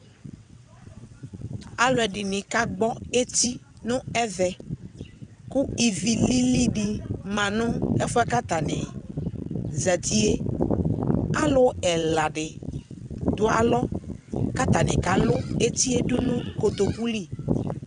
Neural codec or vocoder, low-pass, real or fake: vocoder, 22.05 kHz, 80 mel bands, WaveNeXt; 9.9 kHz; fake